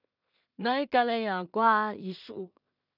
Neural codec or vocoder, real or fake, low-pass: codec, 16 kHz in and 24 kHz out, 0.4 kbps, LongCat-Audio-Codec, two codebook decoder; fake; 5.4 kHz